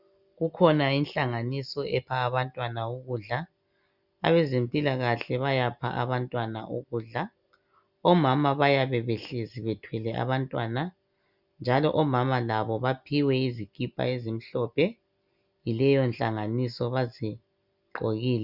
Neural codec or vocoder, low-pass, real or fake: none; 5.4 kHz; real